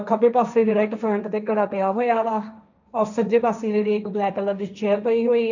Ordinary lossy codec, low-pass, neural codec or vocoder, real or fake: none; 7.2 kHz; codec, 16 kHz, 1.1 kbps, Voila-Tokenizer; fake